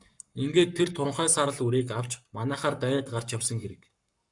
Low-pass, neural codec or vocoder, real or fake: 10.8 kHz; codec, 44.1 kHz, 7.8 kbps, Pupu-Codec; fake